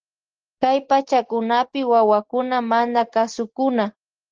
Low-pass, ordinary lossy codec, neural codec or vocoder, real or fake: 7.2 kHz; Opus, 16 kbps; none; real